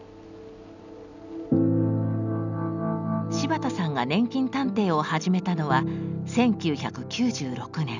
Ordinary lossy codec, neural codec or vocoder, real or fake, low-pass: none; none; real; 7.2 kHz